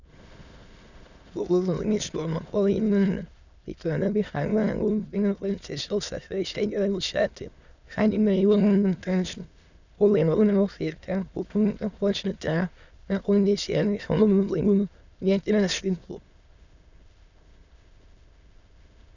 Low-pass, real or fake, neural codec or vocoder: 7.2 kHz; fake; autoencoder, 22.05 kHz, a latent of 192 numbers a frame, VITS, trained on many speakers